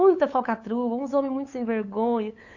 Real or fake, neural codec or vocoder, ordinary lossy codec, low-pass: fake; codec, 16 kHz, 8 kbps, FunCodec, trained on LibriTTS, 25 frames a second; AAC, 48 kbps; 7.2 kHz